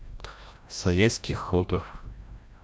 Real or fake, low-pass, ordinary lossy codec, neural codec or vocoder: fake; none; none; codec, 16 kHz, 0.5 kbps, FreqCodec, larger model